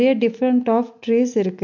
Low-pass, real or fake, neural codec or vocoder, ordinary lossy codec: 7.2 kHz; real; none; MP3, 48 kbps